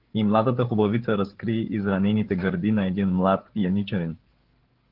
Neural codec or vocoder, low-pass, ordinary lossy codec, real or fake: codec, 16 kHz, 8 kbps, FreqCodec, larger model; 5.4 kHz; Opus, 16 kbps; fake